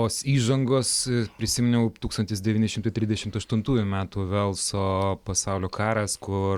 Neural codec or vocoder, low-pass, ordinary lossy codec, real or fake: none; 19.8 kHz; Opus, 64 kbps; real